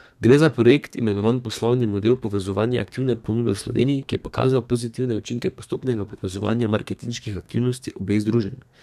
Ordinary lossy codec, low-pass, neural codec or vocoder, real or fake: none; 14.4 kHz; codec, 32 kHz, 1.9 kbps, SNAC; fake